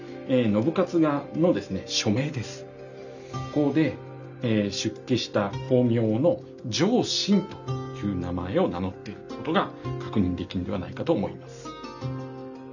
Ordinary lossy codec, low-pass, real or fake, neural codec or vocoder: none; 7.2 kHz; real; none